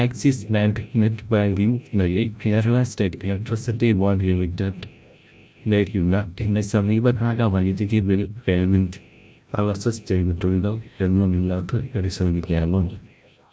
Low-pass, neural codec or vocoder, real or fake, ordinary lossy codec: none; codec, 16 kHz, 0.5 kbps, FreqCodec, larger model; fake; none